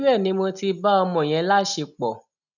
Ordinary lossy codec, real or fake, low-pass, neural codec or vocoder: none; real; 7.2 kHz; none